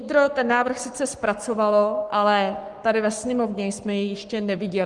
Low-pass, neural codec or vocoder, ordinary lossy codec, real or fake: 10.8 kHz; codec, 44.1 kHz, 7.8 kbps, Pupu-Codec; Opus, 24 kbps; fake